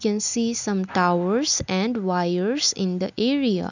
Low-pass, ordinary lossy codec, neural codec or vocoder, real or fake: 7.2 kHz; none; none; real